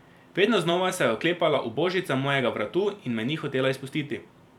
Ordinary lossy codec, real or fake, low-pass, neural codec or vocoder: none; fake; 19.8 kHz; vocoder, 44.1 kHz, 128 mel bands every 256 samples, BigVGAN v2